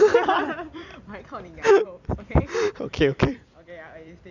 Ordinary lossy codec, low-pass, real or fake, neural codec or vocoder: none; 7.2 kHz; fake; vocoder, 44.1 kHz, 128 mel bands every 256 samples, BigVGAN v2